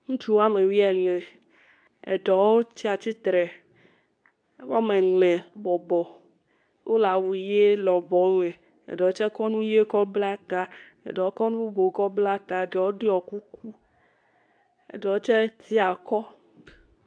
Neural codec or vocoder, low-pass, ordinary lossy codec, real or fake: codec, 24 kHz, 0.9 kbps, WavTokenizer, medium speech release version 2; 9.9 kHz; AAC, 64 kbps; fake